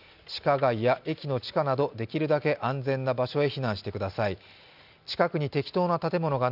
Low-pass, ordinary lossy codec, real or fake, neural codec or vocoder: 5.4 kHz; none; real; none